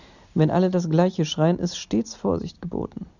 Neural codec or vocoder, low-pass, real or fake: none; 7.2 kHz; real